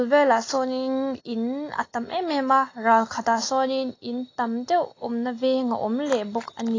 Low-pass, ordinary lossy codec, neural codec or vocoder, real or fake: 7.2 kHz; AAC, 32 kbps; none; real